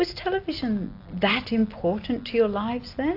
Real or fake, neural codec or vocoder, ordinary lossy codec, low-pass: real; none; AAC, 32 kbps; 5.4 kHz